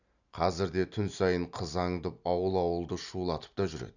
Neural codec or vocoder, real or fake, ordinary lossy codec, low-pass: none; real; none; 7.2 kHz